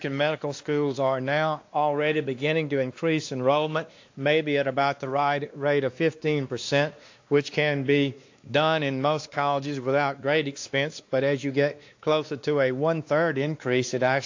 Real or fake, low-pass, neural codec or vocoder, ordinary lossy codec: fake; 7.2 kHz; codec, 16 kHz, 2 kbps, X-Codec, WavLM features, trained on Multilingual LibriSpeech; AAC, 48 kbps